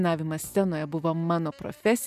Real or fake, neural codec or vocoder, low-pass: real; none; 14.4 kHz